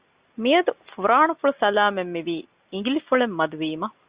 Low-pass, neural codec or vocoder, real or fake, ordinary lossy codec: 3.6 kHz; none; real; Opus, 64 kbps